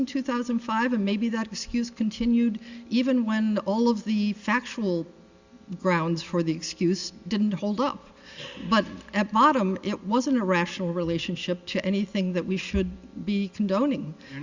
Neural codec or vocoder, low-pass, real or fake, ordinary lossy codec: none; 7.2 kHz; real; Opus, 64 kbps